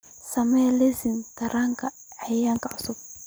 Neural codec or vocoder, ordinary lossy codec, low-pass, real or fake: none; none; none; real